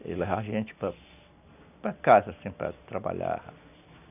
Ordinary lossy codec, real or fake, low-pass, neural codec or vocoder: none; real; 3.6 kHz; none